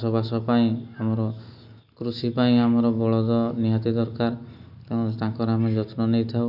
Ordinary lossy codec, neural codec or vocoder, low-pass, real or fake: none; none; 5.4 kHz; real